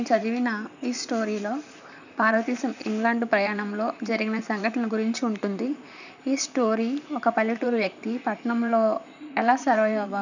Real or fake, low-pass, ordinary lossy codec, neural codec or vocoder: fake; 7.2 kHz; none; vocoder, 44.1 kHz, 128 mel bands, Pupu-Vocoder